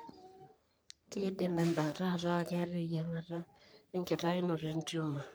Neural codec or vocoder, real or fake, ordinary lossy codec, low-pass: codec, 44.1 kHz, 3.4 kbps, Pupu-Codec; fake; none; none